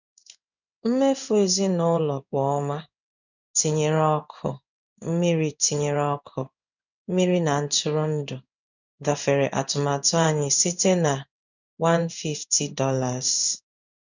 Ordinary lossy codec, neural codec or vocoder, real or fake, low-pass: none; codec, 16 kHz in and 24 kHz out, 1 kbps, XY-Tokenizer; fake; 7.2 kHz